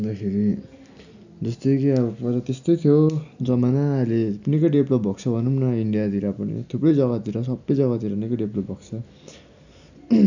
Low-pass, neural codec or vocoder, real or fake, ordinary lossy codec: 7.2 kHz; none; real; none